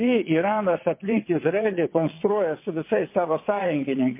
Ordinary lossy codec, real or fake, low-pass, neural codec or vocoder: MP3, 24 kbps; fake; 3.6 kHz; vocoder, 22.05 kHz, 80 mel bands, WaveNeXt